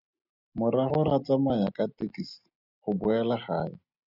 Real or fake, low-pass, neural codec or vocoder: real; 5.4 kHz; none